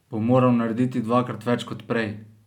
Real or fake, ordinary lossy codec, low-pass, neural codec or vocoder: real; none; 19.8 kHz; none